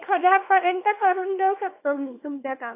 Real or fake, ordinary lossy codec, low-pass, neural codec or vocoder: fake; AAC, 24 kbps; 3.6 kHz; codec, 24 kHz, 0.9 kbps, WavTokenizer, small release